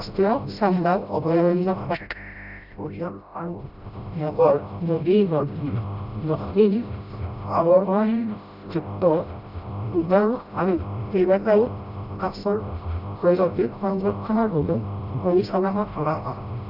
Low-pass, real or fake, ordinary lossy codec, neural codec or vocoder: 5.4 kHz; fake; none; codec, 16 kHz, 0.5 kbps, FreqCodec, smaller model